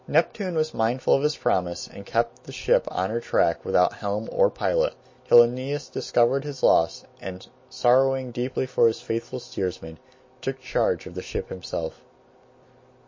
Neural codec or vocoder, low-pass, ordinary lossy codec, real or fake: none; 7.2 kHz; MP3, 32 kbps; real